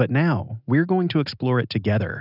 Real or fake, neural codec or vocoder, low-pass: real; none; 5.4 kHz